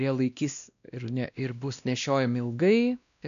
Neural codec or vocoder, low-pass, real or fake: codec, 16 kHz, 1 kbps, X-Codec, WavLM features, trained on Multilingual LibriSpeech; 7.2 kHz; fake